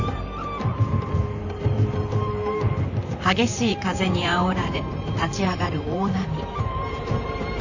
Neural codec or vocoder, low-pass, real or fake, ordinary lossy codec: vocoder, 44.1 kHz, 128 mel bands, Pupu-Vocoder; 7.2 kHz; fake; none